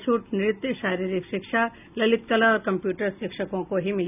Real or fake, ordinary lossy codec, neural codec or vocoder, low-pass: real; none; none; 3.6 kHz